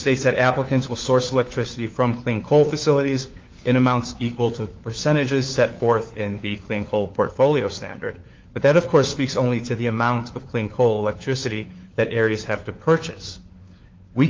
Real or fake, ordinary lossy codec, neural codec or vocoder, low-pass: fake; Opus, 32 kbps; codec, 16 kHz, 4 kbps, FunCodec, trained on LibriTTS, 50 frames a second; 7.2 kHz